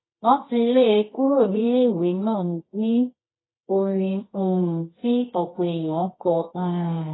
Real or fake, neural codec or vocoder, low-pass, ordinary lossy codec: fake; codec, 24 kHz, 0.9 kbps, WavTokenizer, medium music audio release; 7.2 kHz; AAC, 16 kbps